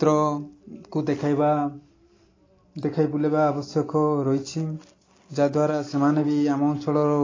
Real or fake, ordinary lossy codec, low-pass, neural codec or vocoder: real; AAC, 32 kbps; 7.2 kHz; none